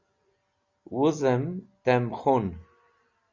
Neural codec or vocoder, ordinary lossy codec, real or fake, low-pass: none; Opus, 64 kbps; real; 7.2 kHz